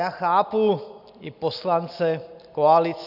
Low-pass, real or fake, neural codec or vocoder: 5.4 kHz; real; none